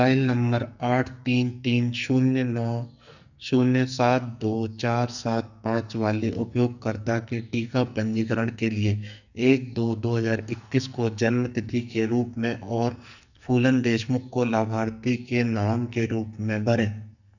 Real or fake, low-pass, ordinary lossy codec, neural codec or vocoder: fake; 7.2 kHz; none; codec, 44.1 kHz, 2.6 kbps, SNAC